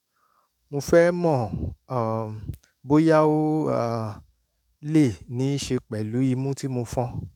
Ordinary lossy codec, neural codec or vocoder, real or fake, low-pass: none; codec, 44.1 kHz, 7.8 kbps, DAC; fake; 19.8 kHz